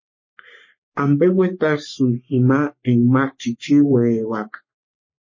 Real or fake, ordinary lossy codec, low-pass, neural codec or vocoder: fake; MP3, 32 kbps; 7.2 kHz; codec, 44.1 kHz, 3.4 kbps, Pupu-Codec